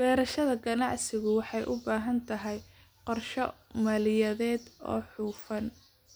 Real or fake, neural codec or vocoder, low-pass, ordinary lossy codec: real; none; none; none